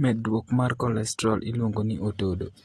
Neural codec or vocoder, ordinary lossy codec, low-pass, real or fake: none; AAC, 32 kbps; 19.8 kHz; real